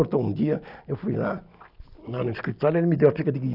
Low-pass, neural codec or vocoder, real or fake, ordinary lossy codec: 5.4 kHz; none; real; none